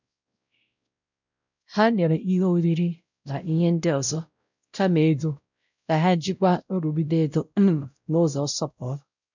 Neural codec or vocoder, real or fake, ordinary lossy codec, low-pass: codec, 16 kHz, 0.5 kbps, X-Codec, WavLM features, trained on Multilingual LibriSpeech; fake; none; 7.2 kHz